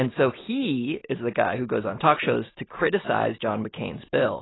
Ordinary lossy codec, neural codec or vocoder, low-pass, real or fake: AAC, 16 kbps; none; 7.2 kHz; real